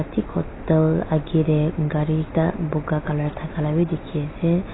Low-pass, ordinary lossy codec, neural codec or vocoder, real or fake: 7.2 kHz; AAC, 16 kbps; none; real